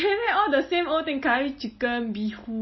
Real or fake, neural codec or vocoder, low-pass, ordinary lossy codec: real; none; 7.2 kHz; MP3, 24 kbps